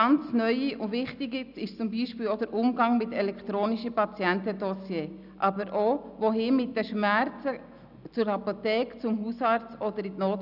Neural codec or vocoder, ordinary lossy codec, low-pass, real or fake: none; none; 5.4 kHz; real